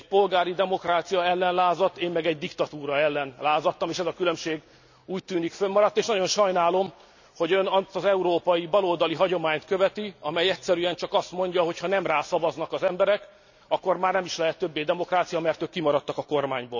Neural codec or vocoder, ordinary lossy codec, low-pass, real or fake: none; none; 7.2 kHz; real